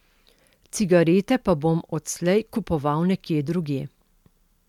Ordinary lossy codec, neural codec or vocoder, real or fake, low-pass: MP3, 96 kbps; none; real; 19.8 kHz